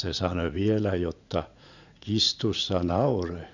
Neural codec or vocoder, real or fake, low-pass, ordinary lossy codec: vocoder, 44.1 kHz, 80 mel bands, Vocos; fake; 7.2 kHz; none